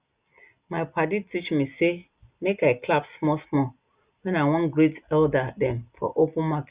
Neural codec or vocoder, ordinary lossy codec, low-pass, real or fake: none; Opus, 64 kbps; 3.6 kHz; real